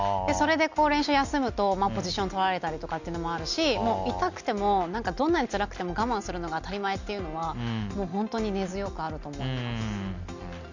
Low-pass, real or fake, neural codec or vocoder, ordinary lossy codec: 7.2 kHz; real; none; none